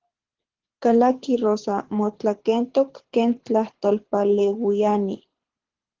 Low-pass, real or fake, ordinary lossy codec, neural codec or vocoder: 7.2 kHz; fake; Opus, 16 kbps; codec, 24 kHz, 6 kbps, HILCodec